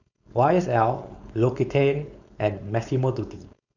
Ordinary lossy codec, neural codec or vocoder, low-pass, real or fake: none; codec, 16 kHz, 4.8 kbps, FACodec; 7.2 kHz; fake